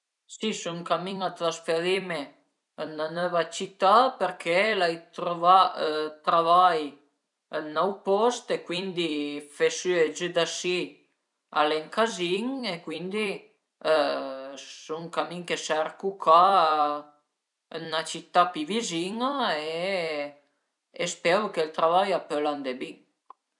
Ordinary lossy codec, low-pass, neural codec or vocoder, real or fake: none; 10.8 kHz; vocoder, 44.1 kHz, 128 mel bands every 256 samples, BigVGAN v2; fake